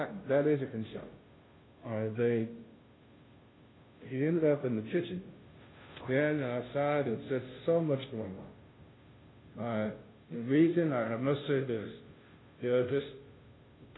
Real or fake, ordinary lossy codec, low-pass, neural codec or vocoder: fake; AAC, 16 kbps; 7.2 kHz; codec, 16 kHz, 0.5 kbps, FunCodec, trained on Chinese and English, 25 frames a second